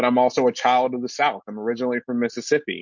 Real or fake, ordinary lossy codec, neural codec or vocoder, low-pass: real; MP3, 48 kbps; none; 7.2 kHz